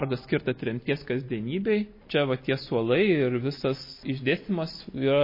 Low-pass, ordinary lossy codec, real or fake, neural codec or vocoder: 5.4 kHz; MP3, 24 kbps; real; none